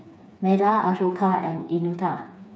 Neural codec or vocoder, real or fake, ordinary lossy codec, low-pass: codec, 16 kHz, 4 kbps, FreqCodec, smaller model; fake; none; none